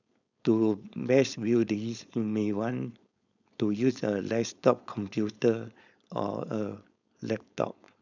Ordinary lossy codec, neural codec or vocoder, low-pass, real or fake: none; codec, 16 kHz, 4.8 kbps, FACodec; 7.2 kHz; fake